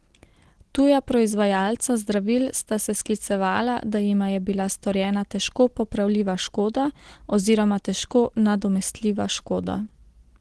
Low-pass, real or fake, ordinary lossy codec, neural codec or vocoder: 10.8 kHz; real; Opus, 16 kbps; none